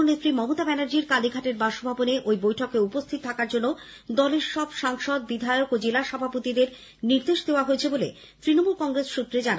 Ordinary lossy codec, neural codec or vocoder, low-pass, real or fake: none; none; none; real